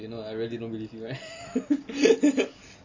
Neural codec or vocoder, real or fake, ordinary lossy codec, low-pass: none; real; MP3, 32 kbps; 7.2 kHz